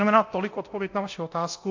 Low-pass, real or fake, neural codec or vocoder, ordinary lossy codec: 7.2 kHz; fake; codec, 24 kHz, 0.9 kbps, DualCodec; AAC, 48 kbps